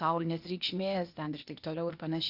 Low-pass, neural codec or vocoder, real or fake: 5.4 kHz; codec, 16 kHz, 0.8 kbps, ZipCodec; fake